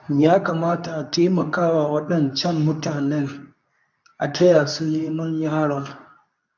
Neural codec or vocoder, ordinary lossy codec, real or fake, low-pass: codec, 24 kHz, 0.9 kbps, WavTokenizer, medium speech release version 2; none; fake; 7.2 kHz